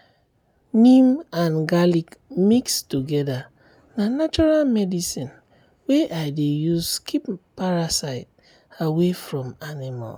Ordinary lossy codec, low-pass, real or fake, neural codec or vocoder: none; none; real; none